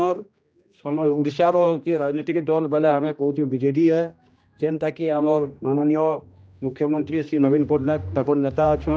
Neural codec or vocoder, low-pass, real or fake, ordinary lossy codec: codec, 16 kHz, 1 kbps, X-Codec, HuBERT features, trained on general audio; none; fake; none